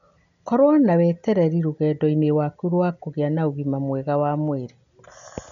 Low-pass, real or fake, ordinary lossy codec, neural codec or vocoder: 7.2 kHz; real; MP3, 96 kbps; none